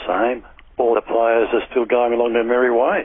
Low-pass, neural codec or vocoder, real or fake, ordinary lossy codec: 7.2 kHz; none; real; AAC, 16 kbps